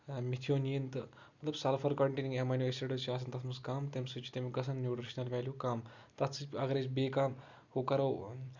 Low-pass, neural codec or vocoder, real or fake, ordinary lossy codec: 7.2 kHz; none; real; Opus, 64 kbps